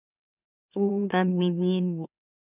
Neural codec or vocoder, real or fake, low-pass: autoencoder, 44.1 kHz, a latent of 192 numbers a frame, MeloTTS; fake; 3.6 kHz